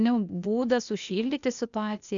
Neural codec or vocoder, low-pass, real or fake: codec, 16 kHz, 0.8 kbps, ZipCodec; 7.2 kHz; fake